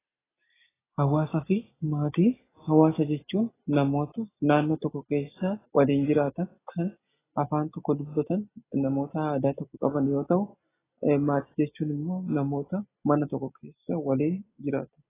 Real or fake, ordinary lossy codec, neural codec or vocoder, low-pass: real; AAC, 16 kbps; none; 3.6 kHz